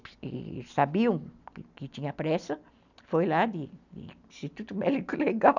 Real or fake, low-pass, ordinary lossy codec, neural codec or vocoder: real; 7.2 kHz; none; none